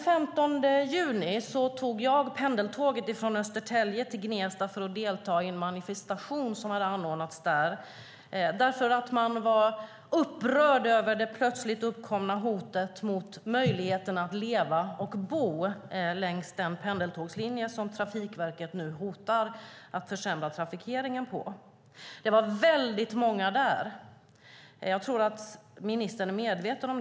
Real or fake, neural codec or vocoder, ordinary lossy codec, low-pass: real; none; none; none